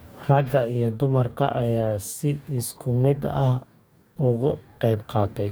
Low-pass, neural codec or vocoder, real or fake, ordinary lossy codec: none; codec, 44.1 kHz, 2.6 kbps, DAC; fake; none